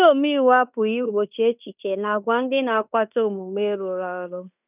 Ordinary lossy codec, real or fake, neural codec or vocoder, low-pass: none; fake; codec, 16 kHz in and 24 kHz out, 0.9 kbps, LongCat-Audio-Codec, fine tuned four codebook decoder; 3.6 kHz